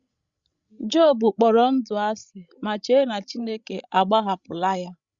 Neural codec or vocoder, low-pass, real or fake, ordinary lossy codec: codec, 16 kHz, 16 kbps, FreqCodec, larger model; 7.2 kHz; fake; Opus, 64 kbps